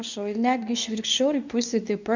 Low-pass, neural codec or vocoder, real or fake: 7.2 kHz; codec, 24 kHz, 0.9 kbps, WavTokenizer, medium speech release version 2; fake